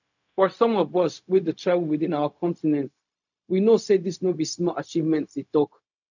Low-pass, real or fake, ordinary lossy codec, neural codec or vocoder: 7.2 kHz; fake; none; codec, 16 kHz, 0.4 kbps, LongCat-Audio-Codec